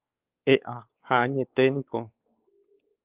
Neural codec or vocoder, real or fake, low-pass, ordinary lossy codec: codec, 16 kHz, 4 kbps, X-Codec, WavLM features, trained on Multilingual LibriSpeech; fake; 3.6 kHz; Opus, 24 kbps